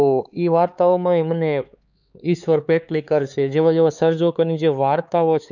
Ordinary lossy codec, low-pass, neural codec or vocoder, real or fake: none; 7.2 kHz; codec, 16 kHz, 4 kbps, X-Codec, HuBERT features, trained on LibriSpeech; fake